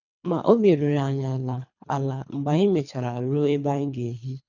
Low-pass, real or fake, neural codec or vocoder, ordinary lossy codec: 7.2 kHz; fake; codec, 24 kHz, 3 kbps, HILCodec; none